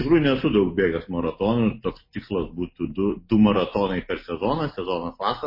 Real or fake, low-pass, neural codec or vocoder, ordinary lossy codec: real; 5.4 kHz; none; MP3, 24 kbps